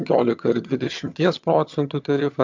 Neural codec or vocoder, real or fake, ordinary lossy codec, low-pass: vocoder, 22.05 kHz, 80 mel bands, HiFi-GAN; fake; MP3, 64 kbps; 7.2 kHz